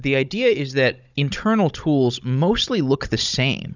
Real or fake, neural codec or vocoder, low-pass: fake; codec, 16 kHz, 16 kbps, FunCodec, trained on Chinese and English, 50 frames a second; 7.2 kHz